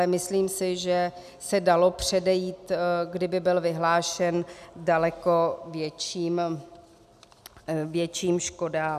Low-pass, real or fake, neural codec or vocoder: 14.4 kHz; real; none